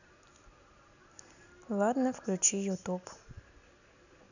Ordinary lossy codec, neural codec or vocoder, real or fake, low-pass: none; none; real; 7.2 kHz